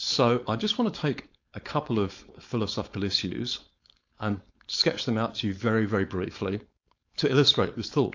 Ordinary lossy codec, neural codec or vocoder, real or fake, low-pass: AAC, 48 kbps; codec, 16 kHz, 4.8 kbps, FACodec; fake; 7.2 kHz